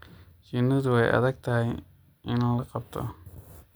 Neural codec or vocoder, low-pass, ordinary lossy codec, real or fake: none; none; none; real